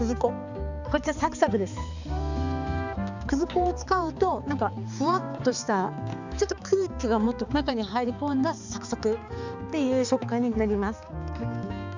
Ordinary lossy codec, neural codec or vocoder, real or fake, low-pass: none; codec, 16 kHz, 4 kbps, X-Codec, HuBERT features, trained on balanced general audio; fake; 7.2 kHz